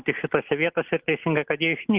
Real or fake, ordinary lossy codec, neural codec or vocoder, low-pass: real; Opus, 16 kbps; none; 3.6 kHz